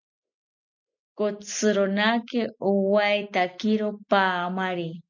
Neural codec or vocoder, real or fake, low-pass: none; real; 7.2 kHz